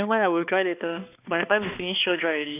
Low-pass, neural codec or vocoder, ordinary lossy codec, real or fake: 3.6 kHz; codec, 16 kHz, 2 kbps, X-Codec, HuBERT features, trained on balanced general audio; none; fake